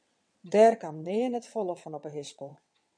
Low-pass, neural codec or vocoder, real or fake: 9.9 kHz; vocoder, 22.05 kHz, 80 mel bands, WaveNeXt; fake